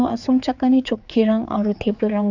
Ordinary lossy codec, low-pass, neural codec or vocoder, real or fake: none; 7.2 kHz; codec, 16 kHz, 4 kbps, X-Codec, HuBERT features, trained on general audio; fake